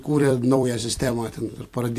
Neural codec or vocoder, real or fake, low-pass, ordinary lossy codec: vocoder, 44.1 kHz, 128 mel bands every 256 samples, BigVGAN v2; fake; 14.4 kHz; AAC, 64 kbps